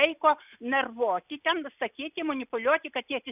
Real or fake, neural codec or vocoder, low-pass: real; none; 3.6 kHz